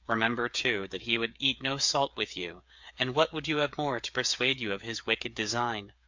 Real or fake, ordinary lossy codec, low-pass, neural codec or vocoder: fake; MP3, 64 kbps; 7.2 kHz; codec, 16 kHz, 8 kbps, FreqCodec, smaller model